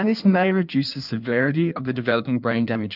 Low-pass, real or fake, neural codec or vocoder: 5.4 kHz; fake; codec, 16 kHz in and 24 kHz out, 1.1 kbps, FireRedTTS-2 codec